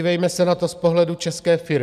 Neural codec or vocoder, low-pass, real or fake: none; 14.4 kHz; real